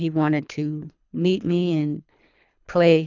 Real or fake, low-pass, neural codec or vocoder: fake; 7.2 kHz; codec, 24 kHz, 3 kbps, HILCodec